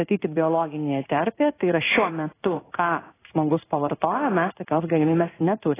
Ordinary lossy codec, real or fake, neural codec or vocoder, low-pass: AAC, 16 kbps; fake; codec, 16 kHz in and 24 kHz out, 1 kbps, XY-Tokenizer; 3.6 kHz